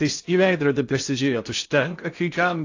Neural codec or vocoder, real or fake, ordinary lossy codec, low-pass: codec, 16 kHz in and 24 kHz out, 0.6 kbps, FocalCodec, streaming, 2048 codes; fake; AAC, 48 kbps; 7.2 kHz